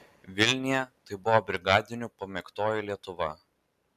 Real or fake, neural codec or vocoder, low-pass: real; none; 14.4 kHz